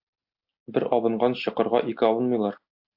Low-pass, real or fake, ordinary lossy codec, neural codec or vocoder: 5.4 kHz; real; AAC, 48 kbps; none